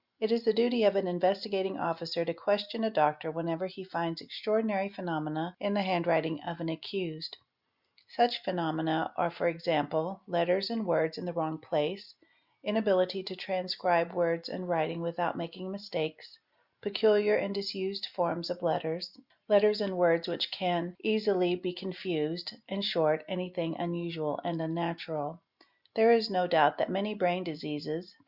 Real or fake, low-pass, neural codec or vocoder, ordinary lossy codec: real; 5.4 kHz; none; Opus, 64 kbps